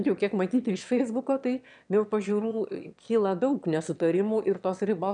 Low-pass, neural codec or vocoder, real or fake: 9.9 kHz; autoencoder, 22.05 kHz, a latent of 192 numbers a frame, VITS, trained on one speaker; fake